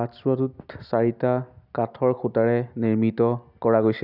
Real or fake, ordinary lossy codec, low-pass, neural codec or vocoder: real; none; 5.4 kHz; none